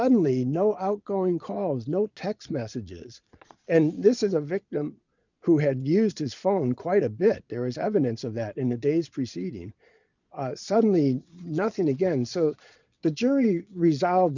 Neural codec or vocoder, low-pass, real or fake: vocoder, 22.05 kHz, 80 mel bands, Vocos; 7.2 kHz; fake